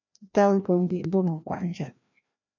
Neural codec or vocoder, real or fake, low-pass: codec, 16 kHz, 1 kbps, FreqCodec, larger model; fake; 7.2 kHz